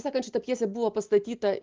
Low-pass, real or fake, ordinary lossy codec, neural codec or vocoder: 7.2 kHz; real; Opus, 32 kbps; none